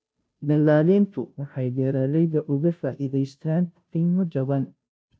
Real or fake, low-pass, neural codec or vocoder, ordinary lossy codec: fake; none; codec, 16 kHz, 0.5 kbps, FunCodec, trained on Chinese and English, 25 frames a second; none